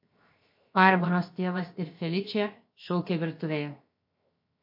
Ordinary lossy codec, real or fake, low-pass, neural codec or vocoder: MP3, 32 kbps; fake; 5.4 kHz; codec, 16 kHz, 0.7 kbps, FocalCodec